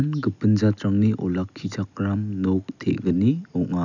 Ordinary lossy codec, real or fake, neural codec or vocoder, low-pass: none; real; none; 7.2 kHz